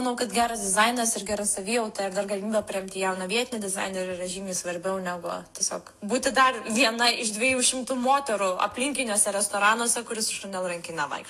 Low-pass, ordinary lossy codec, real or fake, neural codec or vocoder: 14.4 kHz; AAC, 48 kbps; fake; autoencoder, 48 kHz, 128 numbers a frame, DAC-VAE, trained on Japanese speech